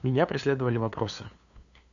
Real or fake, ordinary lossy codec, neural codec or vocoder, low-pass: fake; MP3, 96 kbps; codec, 16 kHz, 2 kbps, FunCodec, trained on LibriTTS, 25 frames a second; 7.2 kHz